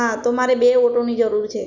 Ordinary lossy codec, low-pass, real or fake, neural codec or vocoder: none; 7.2 kHz; real; none